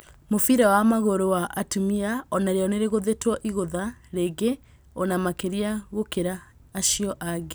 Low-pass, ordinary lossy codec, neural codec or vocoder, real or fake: none; none; none; real